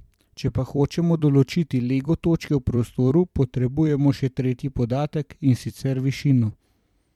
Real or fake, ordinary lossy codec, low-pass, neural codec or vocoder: fake; MP3, 96 kbps; 19.8 kHz; vocoder, 44.1 kHz, 128 mel bands every 256 samples, BigVGAN v2